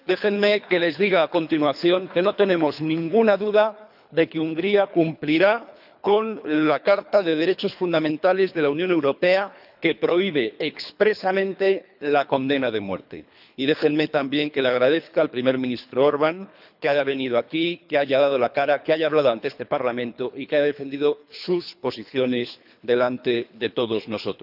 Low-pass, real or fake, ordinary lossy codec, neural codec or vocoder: 5.4 kHz; fake; none; codec, 24 kHz, 3 kbps, HILCodec